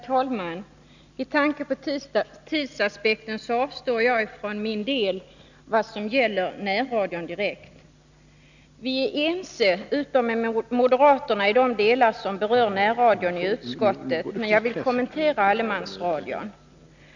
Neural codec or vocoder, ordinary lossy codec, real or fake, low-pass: none; none; real; 7.2 kHz